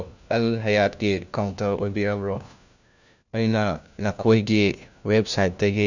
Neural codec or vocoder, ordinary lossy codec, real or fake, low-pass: codec, 16 kHz, 1 kbps, FunCodec, trained on LibriTTS, 50 frames a second; none; fake; 7.2 kHz